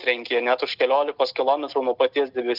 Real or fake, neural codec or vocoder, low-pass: real; none; 5.4 kHz